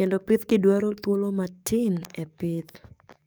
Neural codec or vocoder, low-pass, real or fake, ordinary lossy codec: codec, 44.1 kHz, 7.8 kbps, DAC; none; fake; none